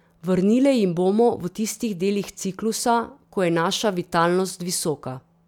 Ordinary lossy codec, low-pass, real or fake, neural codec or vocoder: none; 19.8 kHz; real; none